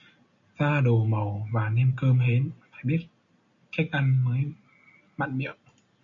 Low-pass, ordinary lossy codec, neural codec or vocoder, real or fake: 7.2 kHz; MP3, 48 kbps; none; real